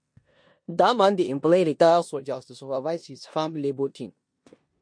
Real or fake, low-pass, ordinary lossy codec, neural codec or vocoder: fake; 9.9 kHz; MP3, 48 kbps; codec, 16 kHz in and 24 kHz out, 0.9 kbps, LongCat-Audio-Codec, four codebook decoder